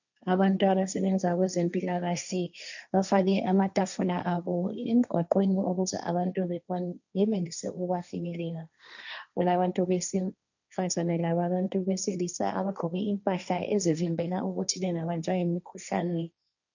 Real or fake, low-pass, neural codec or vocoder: fake; 7.2 kHz; codec, 16 kHz, 1.1 kbps, Voila-Tokenizer